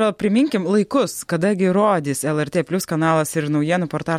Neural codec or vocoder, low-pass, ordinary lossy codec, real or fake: none; 19.8 kHz; MP3, 64 kbps; real